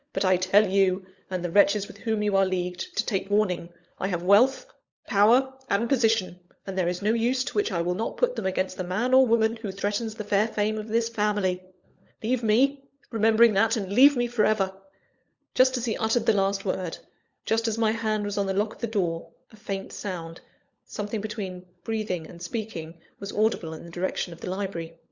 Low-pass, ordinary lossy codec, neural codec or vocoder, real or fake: 7.2 kHz; Opus, 64 kbps; codec, 16 kHz, 16 kbps, FunCodec, trained on LibriTTS, 50 frames a second; fake